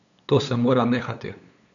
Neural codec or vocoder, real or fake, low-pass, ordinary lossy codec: codec, 16 kHz, 8 kbps, FunCodec, trained on LibriTTS, 25 frames a second; fake; 7.2 kHz; none